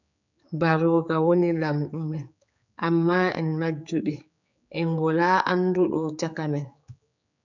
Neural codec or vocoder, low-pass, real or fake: codec, 16 kHz, 4 kbps, X-Codec, HuBERT features, trained on general audio; 7.2 kHz; fake